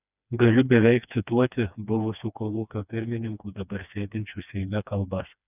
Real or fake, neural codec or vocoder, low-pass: fake; codec, 16 kHz, 2 kbps, FreqCodec, smaller model; 3.6 kHz